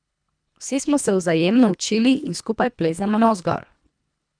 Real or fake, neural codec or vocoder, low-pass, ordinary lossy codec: fake; codec, 24 kHz, 1.5 kbps, HILCodec; 9.9 kHz; none